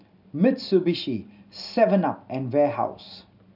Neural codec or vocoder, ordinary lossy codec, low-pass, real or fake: none; none; 5.4 kHz; real